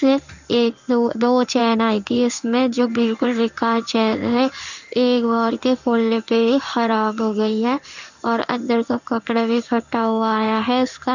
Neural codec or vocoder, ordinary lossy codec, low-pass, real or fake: codec, 16 kHz in and 24 kHz out, 1 kbps, XY-Tokenizer; none; 7.2 kHz; fake